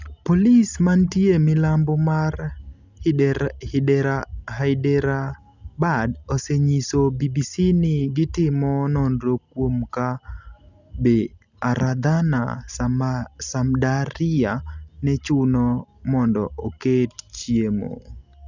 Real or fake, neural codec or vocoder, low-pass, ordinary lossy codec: real; none; 7.2 kHz; none